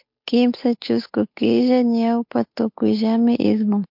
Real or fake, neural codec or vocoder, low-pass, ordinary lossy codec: fake; codec, 16 kHz, 8 kbps, FunCodec, trained on Chinese and English, 25 frames a second; 5.4 kHz; MP3, 48 kbps